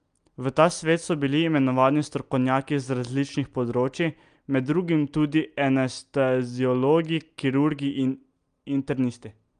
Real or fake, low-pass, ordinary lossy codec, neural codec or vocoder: real; 9.9 kHz; Opus, 32 kbps; none